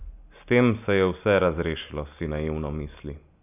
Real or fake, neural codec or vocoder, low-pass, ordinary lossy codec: real; none; 3.6 kHz; none